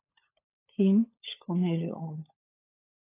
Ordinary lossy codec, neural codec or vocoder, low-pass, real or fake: AAC, 24 kbps; codec, 16 kHz, 16 kbps, FunCodec, trained on LibriTTS, 50 frames a second; 3.6 kHz; fake